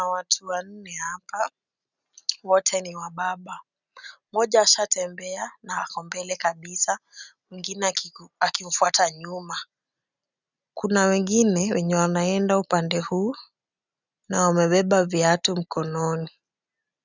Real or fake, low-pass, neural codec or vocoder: real; 7.2 kHz; none